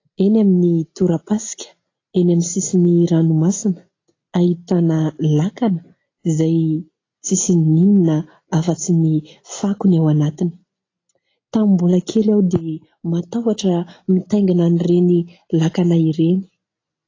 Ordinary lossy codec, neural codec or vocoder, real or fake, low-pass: AAC, 32 kbps; none; real; 7.2 kHz